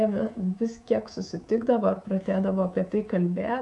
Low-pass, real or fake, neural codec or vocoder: 10.8 kHz; fake; codec, 24 kHz, 3.1 kbps, DualCodec